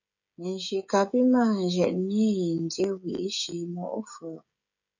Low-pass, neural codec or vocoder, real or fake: 7.2 kHz; codec, 16 kHz, 16 kbps, FreqCodec, smaller model; fake